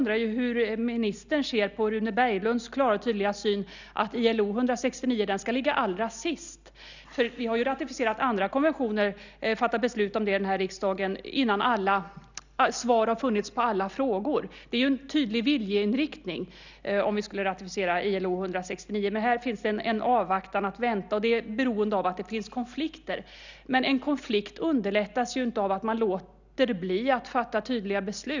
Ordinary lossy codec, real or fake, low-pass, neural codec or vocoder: none; real; 7.2 kHz; none